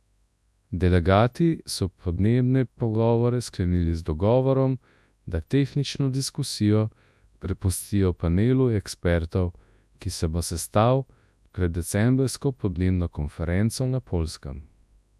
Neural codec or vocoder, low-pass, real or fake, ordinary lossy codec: codec, 24 kHz, 0.9 kbps, WavTokenizer, large speech release; none; fake; none